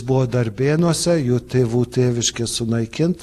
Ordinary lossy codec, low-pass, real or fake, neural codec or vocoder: AAC, 64 kbps; 14.4 kHz; real; none